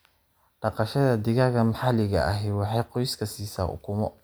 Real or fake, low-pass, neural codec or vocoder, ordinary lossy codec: real; none; none; none